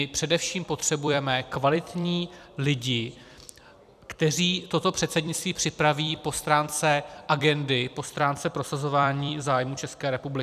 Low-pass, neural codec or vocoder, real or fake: 14.4 kHz; vocoder, 44.1 kHz, 128 mel bands every 256 samples, BigVGAN v2; fake